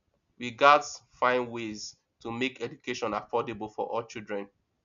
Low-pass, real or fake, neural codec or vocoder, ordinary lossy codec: 7.2 kHz; real; none; none